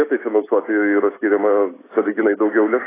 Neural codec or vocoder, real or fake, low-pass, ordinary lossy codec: none; real; 3.6 kHz; AAC, 16 kbps